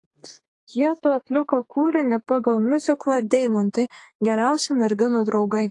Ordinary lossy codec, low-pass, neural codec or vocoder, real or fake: AAC, 48 kbps; 10.8 kHz; codec, 32 kHz, 1.9 kbps, SNAC; fake